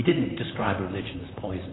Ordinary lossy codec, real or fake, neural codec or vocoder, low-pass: AAC, 16 kbps; fake; vocoder, 44.1 kHz, 128 mel bands, Pupu-Vocoder; 7.2 kHz